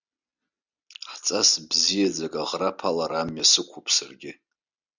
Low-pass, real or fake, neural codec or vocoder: 7.2 kHz; real; none